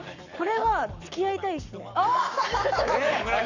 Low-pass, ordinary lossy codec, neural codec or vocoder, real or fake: 7.2 kHz; none; codec, 44.1 kHz, 7.8 kbps, Pupu-Codec; fake